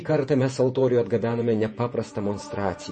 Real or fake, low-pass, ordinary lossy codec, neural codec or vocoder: fake; 10.8 kHz; MP3, 32 kbps; vocoder, 48 kHz, 128 mel bands, Vocos